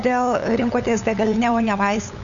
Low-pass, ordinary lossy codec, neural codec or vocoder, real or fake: 7.2 kHz; Opus, 64 kbps; codec, 16 kHz, 8 kbps, FunCodec, trained on LibriTTS, 25 frames a second; fake